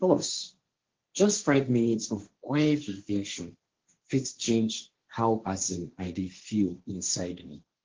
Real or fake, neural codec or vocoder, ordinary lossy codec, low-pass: fake; codec, 16 kHz, 1.1 kbps, Voila-Tokenizer; Opus, 16 kbps; 7.2 kHz